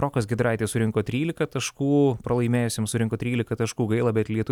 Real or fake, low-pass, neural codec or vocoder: real; 19.8 kHz; none